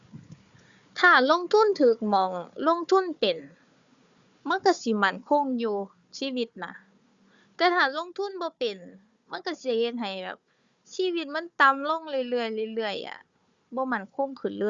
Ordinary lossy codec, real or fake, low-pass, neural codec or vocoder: Opus, 64 kbps; fake; 7.2 kHz; codec, 16 kHz, 4 kbps, FunCodec, trained on Chinese and English, 50 frames a second